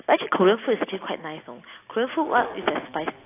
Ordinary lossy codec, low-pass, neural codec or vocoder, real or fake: AAC, 24 kbps; 3.6 kHz; none; real